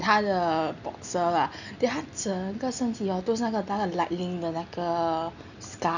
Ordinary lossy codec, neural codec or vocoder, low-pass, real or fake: none; none; 7.2 kHz; real